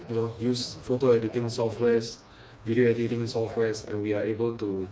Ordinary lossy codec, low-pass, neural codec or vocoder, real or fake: none; none; codec, 16 kHz, 2 kbps, FreqCodec, smaller model; fake